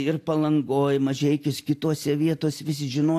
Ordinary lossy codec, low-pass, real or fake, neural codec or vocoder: AAC, 64 kbps; 14.4 kHz; real; none